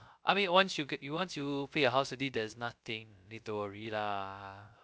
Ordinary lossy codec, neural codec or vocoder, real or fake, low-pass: none; codec, 16 kHz, 0.3 kbps, FocalCodec; fake; none